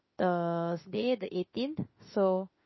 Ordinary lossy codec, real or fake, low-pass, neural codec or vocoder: MP3, 24 kbps; fake; 7.2 kHz; autoencoder, 48 kHz, 32 numbers a frame, DAC-VAE, trained on Japanese speech